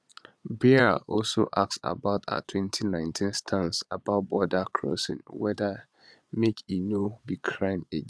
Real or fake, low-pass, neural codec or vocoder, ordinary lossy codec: fake; none; vocoder, 22.05 kHz, 80 mel bands, Vocos; none